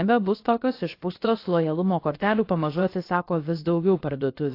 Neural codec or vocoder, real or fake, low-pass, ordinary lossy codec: codec, 16 kHz, about 1 kbps, DyCAST, with the encoder's durations; fake; 5.4 kHz; AAC, 32 kbps